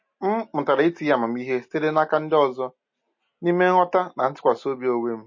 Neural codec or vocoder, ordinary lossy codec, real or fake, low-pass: none; MP3, 32 kbps; real; 7.2 kHz